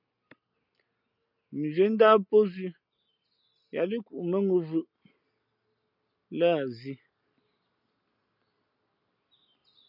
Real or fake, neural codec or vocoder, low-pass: real; none; 5.4 kHz